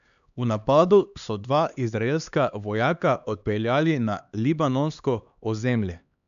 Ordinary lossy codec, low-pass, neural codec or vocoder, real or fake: none; 7.2 kHz; codec, 16 kHz, 2 kbps, X-Codec, HuBERT features, trained on LibriSpeech; fake